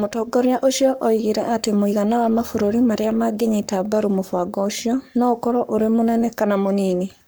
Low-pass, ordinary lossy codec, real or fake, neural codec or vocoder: none; none; fake; codec, 44.1 kHz, 7.8 kbps, Pupu-Codec